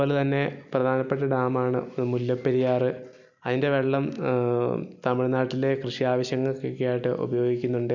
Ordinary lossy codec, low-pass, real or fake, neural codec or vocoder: none; 7.2 kHz; real; none